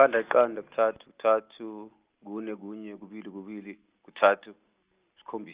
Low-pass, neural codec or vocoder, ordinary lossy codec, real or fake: 3.6 kHz; none; Opus, 32 kbps; real